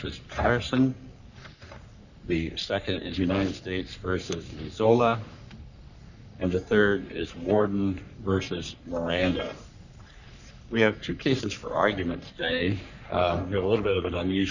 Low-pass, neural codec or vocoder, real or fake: 7.2 kHz; codec, 44.1 kHz, 3.4 kbps, Pupu-Codec; fake